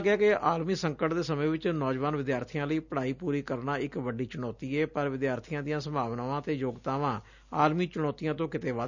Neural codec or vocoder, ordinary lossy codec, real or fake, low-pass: none; none; real; 7.2 kHz